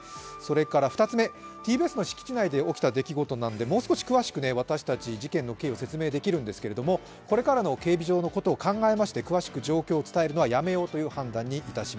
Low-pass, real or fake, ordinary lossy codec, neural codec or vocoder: none; real; none; none